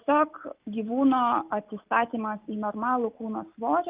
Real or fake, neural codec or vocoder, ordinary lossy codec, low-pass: real; none; Opus, 24 kbps; 3.6 kHz